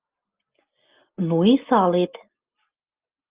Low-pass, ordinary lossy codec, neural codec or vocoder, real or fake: 3.6 kHz; Opus, 32 kbps; none; real